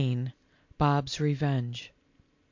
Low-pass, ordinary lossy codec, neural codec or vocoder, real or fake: 7.2 kHz; MP3, 64 kbps; none; real